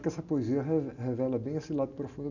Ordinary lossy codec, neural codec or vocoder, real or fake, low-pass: none; none; real; 7.2 kHz